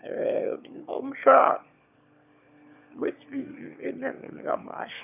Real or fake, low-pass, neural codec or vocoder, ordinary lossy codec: fake; 3.6 kHz; autoencoder, 22.05 kHz, a latent of 192 numbers a frame, VITS, trained on one speaker; none